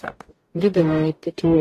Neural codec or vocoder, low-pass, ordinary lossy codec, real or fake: codec, 44.1 kHz, 0.9 kbps, DAC; 14.4 kHz; AAC, 48 kbps; fake